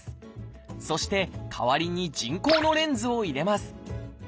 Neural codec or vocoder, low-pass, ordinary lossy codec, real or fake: none; none; none; real